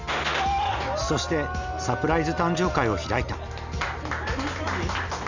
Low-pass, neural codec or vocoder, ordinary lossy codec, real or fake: 7.2 kHz; none; none; real